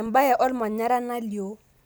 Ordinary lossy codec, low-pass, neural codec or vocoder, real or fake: none; none; none; real